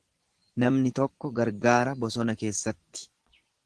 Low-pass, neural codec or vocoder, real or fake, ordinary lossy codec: 10.8 kHz; vocoder, 24 kHz, 100 mel bands, Vocos; fake; Opus, 16 kbps